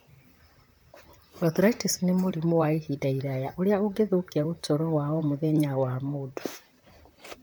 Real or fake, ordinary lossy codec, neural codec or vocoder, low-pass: fake; none; vocoder, 44.1 kHz, 128 mel bands, Pupu-Vocoder; none